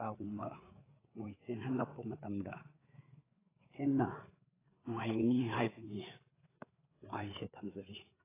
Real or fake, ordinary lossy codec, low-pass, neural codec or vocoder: fake; AAC, 16 kbps; 3.6 kHz; codec, 16 kHz, 4 kbps, FreqCodec, larger model